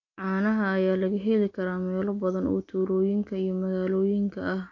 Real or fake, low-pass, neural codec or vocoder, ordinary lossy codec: real; 7.2 kHz; none; MP3, 48 kbps